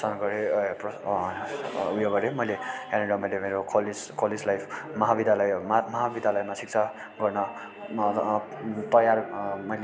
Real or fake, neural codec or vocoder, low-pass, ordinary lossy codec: real; none; none; none